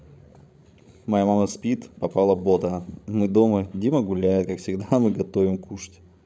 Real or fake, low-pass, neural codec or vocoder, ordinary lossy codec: fake; none; codec, 16 kHz, 16 kbps, FreqCodec, larger model; none